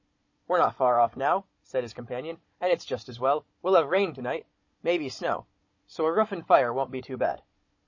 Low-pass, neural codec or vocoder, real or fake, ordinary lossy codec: 7.2 kHz; codec, 16 kHz, 16 kbps, FunCodec, trained on Chinese and English, 50 frames a second; fake; MP3, 32 kbps